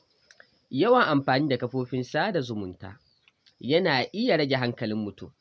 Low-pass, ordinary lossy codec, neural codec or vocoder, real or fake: none; none; none; real